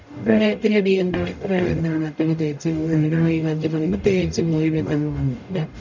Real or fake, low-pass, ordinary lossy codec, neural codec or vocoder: fake; 7.2 kHz; none; codec, 44.1 kHz, 0.9 kbps, DAC